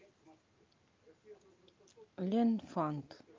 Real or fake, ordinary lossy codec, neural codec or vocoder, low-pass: real; Opus, 24 kbps; none; 7.2 kHz